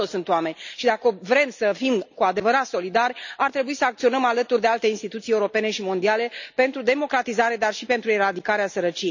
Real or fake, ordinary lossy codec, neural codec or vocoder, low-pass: real; none; none; 7.2 kHz